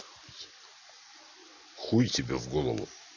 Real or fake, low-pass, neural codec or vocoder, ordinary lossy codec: real; 7.2 kHz; none; none